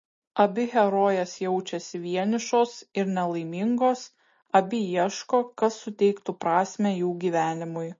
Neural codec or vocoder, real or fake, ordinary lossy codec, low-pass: none; real; MP3, 32 kbps; 7.2 kHz